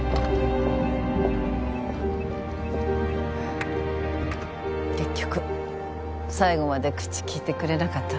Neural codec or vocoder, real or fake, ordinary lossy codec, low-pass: none; real; none; none